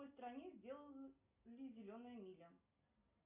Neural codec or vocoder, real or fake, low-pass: none; real; 3.6 kHz